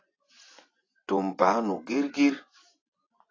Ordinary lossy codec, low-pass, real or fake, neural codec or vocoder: AAC, 32 kbps; 7.2 kHz; fake; vocoder, 24 kHz, 100 mel bands, Vocos